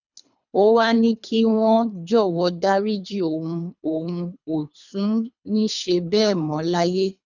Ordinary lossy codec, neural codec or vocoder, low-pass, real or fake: none; codec, 24 kHz, 3 kbps, HILCodec; 7.2 kHz; fake